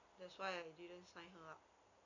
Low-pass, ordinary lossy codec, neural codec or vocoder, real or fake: 7.2 kHz; none; none; real